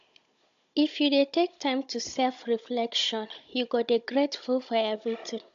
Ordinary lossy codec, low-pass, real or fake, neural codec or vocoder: AAC, 64 kbps; 7.2 kHz; fake; codec, 16 kHz, 16 kbps, FunCodec, trained on Chinese and English, 50 frames a second